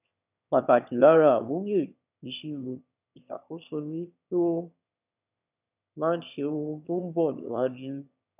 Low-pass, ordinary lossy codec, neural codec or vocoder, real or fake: 3.6 kHz; none; autoencoder, 22.05 kHz, a latent of 192 numbers a frame, VITS, trained on one speaker; fake